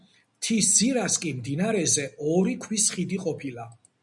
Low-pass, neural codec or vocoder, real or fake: 10.8 kHz; none; real